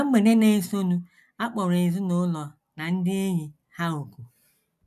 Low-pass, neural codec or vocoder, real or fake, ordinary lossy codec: 14.4 kHz; none; real; none